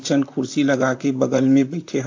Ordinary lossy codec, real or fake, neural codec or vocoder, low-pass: AAC, 48 kbps; fake; vocoder, 44.1 kHz, 128 mel bands, Pupu-Vocoder; 7.2 kHz